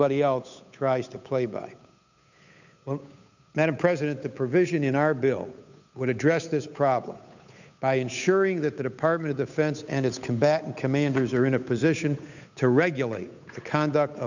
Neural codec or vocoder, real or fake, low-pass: codec, 16 kHz, 8 kbps, FunCodec, trained on Chinese and English, 25 frames a second; fake; 7.2 kHz